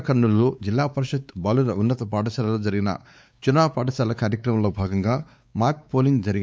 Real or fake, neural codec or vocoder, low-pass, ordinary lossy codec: fake; codec, 16 kHz, 4 kbps, X-Codec, WavLM features, trained on Multilingual LibriSpeech; none; none